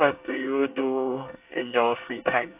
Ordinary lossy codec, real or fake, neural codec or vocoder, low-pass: AAC, 32 kbps; fake; codec, 24 kHz, 1 kbps, SNAC; 3.6 kHz